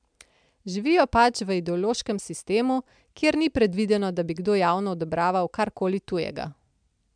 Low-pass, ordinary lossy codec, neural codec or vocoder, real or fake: 9.9 kHz; MP3, 96 kbps; none; real